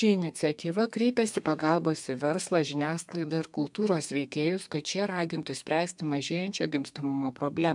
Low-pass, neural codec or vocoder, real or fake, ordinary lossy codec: 10.8 kHz; codec, 32 kHz, 1.9 kbps, SNAC; fake; MP3, 96 kbps